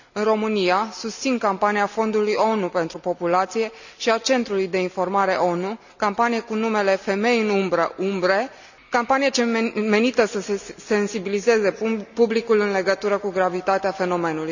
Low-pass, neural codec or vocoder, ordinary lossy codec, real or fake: 7.2 kHz; none; none; real